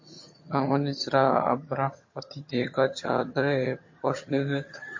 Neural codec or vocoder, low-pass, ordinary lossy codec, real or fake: vocoder, 22.05 kHz, 80 mel bands, HiFi-GAN; 7.2 kHz; MP3, 32 kbps; fake